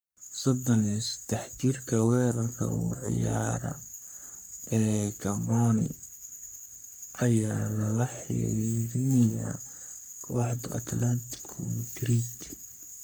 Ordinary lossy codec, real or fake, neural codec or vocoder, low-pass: none; fake; codec, 44.1 kHz, 3.4 kbps, Pupu-Codec; none